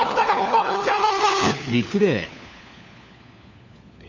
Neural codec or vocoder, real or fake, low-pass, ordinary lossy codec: codec, 16 kHz, 4 kbps, FunCodec, trained on LibriTTS, 50 frames a second; fake; 7.2 kHz; none